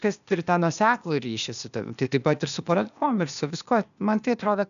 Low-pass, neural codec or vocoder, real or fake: 7.2 kHz; codec, 16 kHz, 0.8 kbps, ZipCodec; fake